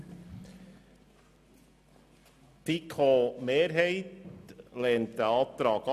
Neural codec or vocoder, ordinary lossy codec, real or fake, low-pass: none; none; real; 14.4 kHz